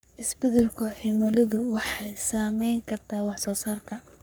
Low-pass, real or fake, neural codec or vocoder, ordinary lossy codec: none; fake; codec, 44.1 kHz, 3.4 kbps, Pupu-Codec; none